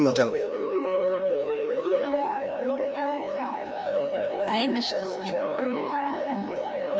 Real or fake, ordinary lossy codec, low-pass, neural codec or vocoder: fake; none; none; codec, 16 kHz, 1 kbps, FreqCodec, larger model